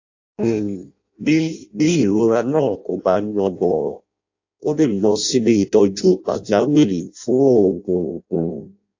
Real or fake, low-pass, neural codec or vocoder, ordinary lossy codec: fake; 7.2 kHz; codec, 16 kHz in and 24 kHz out, 0.6 kbps, FireRedTTS-2 codec; AAC, 48 kbps